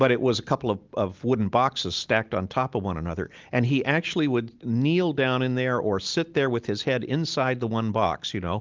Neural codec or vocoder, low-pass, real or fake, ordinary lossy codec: none; 7.2 kHz; real; Opus, 32 kbps